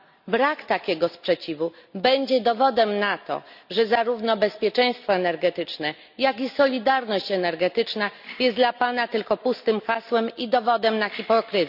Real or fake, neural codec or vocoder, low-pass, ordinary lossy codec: real; none; 5.4 kHz; none